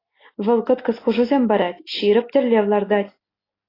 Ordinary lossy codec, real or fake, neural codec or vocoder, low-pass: AAC, 24 kbps; real; none; 5.4 kHz